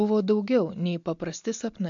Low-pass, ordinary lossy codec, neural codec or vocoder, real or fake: 7.2 kHz; MP3, 64 kbps; none; real